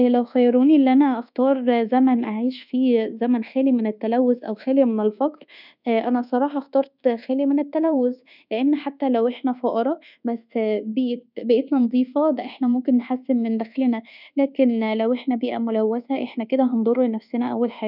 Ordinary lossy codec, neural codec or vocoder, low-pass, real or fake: none; codec, 24 kHz, 1.2 kbps, DualCodec; 5.4 kHz; fake